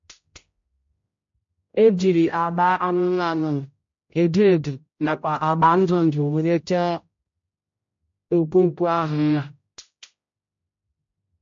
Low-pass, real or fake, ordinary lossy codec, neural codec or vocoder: 7.2 kHz; fake; MP3, 48 kbps; codec, 16 kHz, 0.5 kbps, X-Codec, HuBERT features, trained on general audio